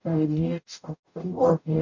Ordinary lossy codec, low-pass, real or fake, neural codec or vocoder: none; 7.2 kHz; fake; codec, 44.1 kHz, 0.9 kbps, DAC